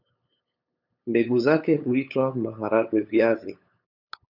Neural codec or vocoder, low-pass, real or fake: codec, 16 kHz, 8 kbps, FunCodec, trained on LibriTTS, 25 frames a second; 5.4 kHz; fake